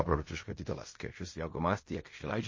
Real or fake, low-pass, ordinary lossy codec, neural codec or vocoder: fake; 7.2 kHz; MP3, 32 kbps; codec, 16 kHz in and 24 kHz out, 0.4 kbps, LongCat-Audio-Codec, fine tuned four codebook decoder